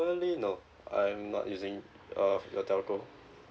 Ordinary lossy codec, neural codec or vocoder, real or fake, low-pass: none; none; real; none